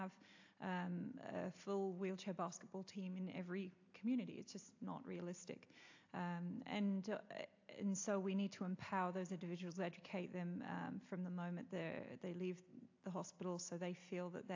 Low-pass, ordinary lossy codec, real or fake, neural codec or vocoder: 7.2 kHz; AAC, 48 kbps; real; none